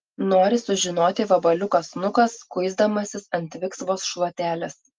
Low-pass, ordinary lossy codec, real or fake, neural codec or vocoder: 7.2 kHz; Opus, 32 kbps; real; none